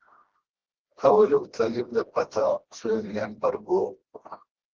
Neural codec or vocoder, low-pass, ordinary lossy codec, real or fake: codec, 16 kHz, 1 kbps, FreqCodec, smaller model; 7.2 kHz; Opus, 16 kbps; fake